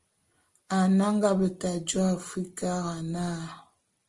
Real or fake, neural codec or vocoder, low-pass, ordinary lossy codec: real; none; 10.8 kHz; Opus, 24 kbps